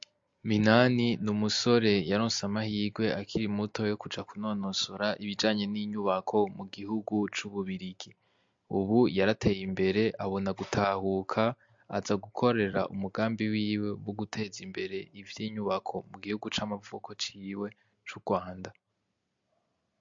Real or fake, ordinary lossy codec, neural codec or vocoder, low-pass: real; MP3, 48 kbps; none; 7.2 kHz